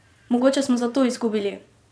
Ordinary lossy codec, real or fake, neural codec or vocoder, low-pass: none; fake; vocoder, 22.05 kHz, 80 mel bands, Vocos; none